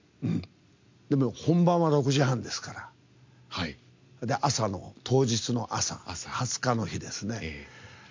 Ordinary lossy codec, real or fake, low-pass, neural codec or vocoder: MP3, 48 kbps; real; 7.2 kHz; none